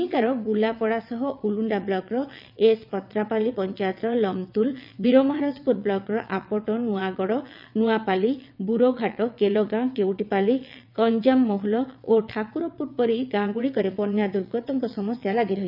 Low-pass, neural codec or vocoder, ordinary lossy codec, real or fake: 5.4 kHz; vocoder, 22.05 kHz, 80 mel bands, WaveNeXt; none; fake